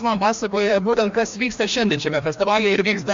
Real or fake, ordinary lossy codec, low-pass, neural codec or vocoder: fake; MP3, 48 kbps; 7.2 kHz; codec, 16 kHz, 1 kbps, FreqCodec, larger model